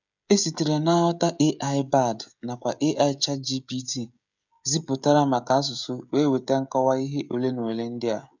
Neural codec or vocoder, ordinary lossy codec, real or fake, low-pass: codec, 16 kHz, 16 kbps, FreqCodec, smaller model; none; fake; 7.2 kHz